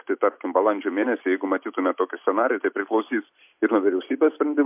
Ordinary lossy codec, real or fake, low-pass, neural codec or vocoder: MP3, 32 kbps; real; 3.6 kHz; none